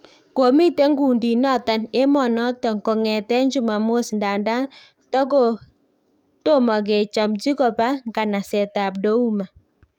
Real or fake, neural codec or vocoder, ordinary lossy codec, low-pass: fake; codec, 44.1 kHz, 7.8 kbps, DAC; none; 19.8 kHz